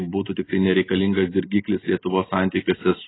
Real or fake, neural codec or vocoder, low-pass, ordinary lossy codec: real; none; 7.2 kHz; AAC, 16 kbps